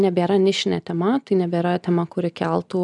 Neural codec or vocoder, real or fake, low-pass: none; real; 10.8 kHz